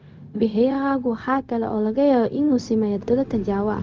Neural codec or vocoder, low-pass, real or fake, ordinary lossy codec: codec, 16 kHz, 0.4 kbps, LongCat-Audio-Codec; 7.2 kHz; fake; Opus, 24 kbps